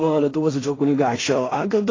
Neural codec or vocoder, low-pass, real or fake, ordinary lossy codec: codec, 16 kHz in and 24 kHz out, 0.4 kbps, LongCat-Audio-Codec, two codebook decoder; 7.2 kHz; fake; AAC, 32 kbps